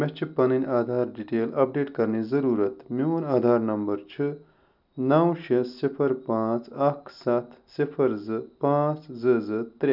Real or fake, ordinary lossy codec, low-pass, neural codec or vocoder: real; none; 5.4 kHz; none